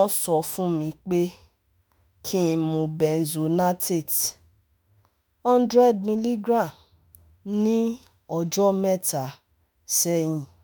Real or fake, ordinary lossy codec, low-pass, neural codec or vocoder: fake; none; none; autoencoder, 48 kHz, 32 numbers a frame, DAC-VAE, trained on Japanese speech